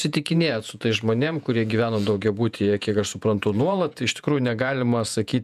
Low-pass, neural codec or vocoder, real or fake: 14.4 kHz; vocoder, 48 kHz, 128 mel bands, Vocos; fake